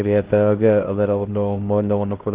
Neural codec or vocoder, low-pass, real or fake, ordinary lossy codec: codec, 16 kHz, 1 kbps, X-Codec, HuBERT features, trained on LibriSpeech; 3.6 kHz; fake; Opus, 16 kbps